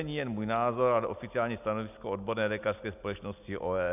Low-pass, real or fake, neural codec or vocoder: 3.6 kHz; real; none